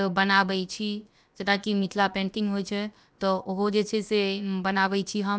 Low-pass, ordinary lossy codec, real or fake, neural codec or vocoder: none; none; fake; codec, 16 kHz, about 1 kbps, DyCAST, with the encoder's durations